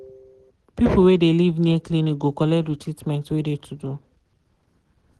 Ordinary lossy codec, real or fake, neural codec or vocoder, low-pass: Opus, 16 kbps; real; none; 10.8 kHz